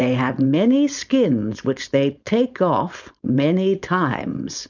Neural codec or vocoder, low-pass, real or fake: codec, 16 kHz, 4.8 kbps, FACodec; 7.2 kHz; fake